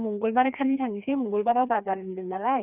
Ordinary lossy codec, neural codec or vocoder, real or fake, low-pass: none; codec, 16 kHz, 2 kbps, FreqCodec, larger model; fake; 3.6 kHz